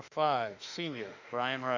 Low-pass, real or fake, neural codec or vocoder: 7.2 kHz; fake; autoencoder, 48 kHz, 32 numbers a frame, DAC-VAE, trained on Japanese speech